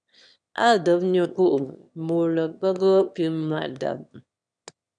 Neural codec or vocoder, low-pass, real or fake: autoencoder, 22.05 kHz, a latent of 192 numbers a frame, VITS, trained on one speaker; 9.9 kHz; fake